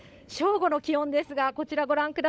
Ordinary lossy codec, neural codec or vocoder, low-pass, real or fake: none; codec, 16 kHz, 16 kbps, FunCodec, trained on LibriTTS, 50 frames a second; none; fake